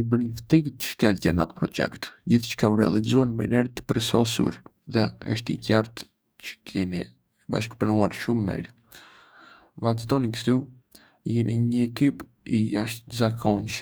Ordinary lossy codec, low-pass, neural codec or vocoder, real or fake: none; none; codec, 44.1 kHz, 2.6 kbps, DAC; fake